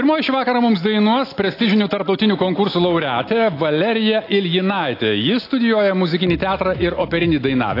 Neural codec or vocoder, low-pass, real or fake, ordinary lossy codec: none; 5.4 kHz; real; AAC, 32 kbps